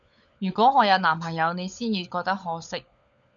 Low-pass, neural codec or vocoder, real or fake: 7.2 kHz; codec, 16 kHz, 16 kbps, FunCodec, trained on LibriTTS, 50 frames a second; fake